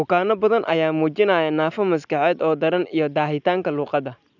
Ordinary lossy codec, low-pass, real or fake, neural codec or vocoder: none; 7.2 kHz; real; none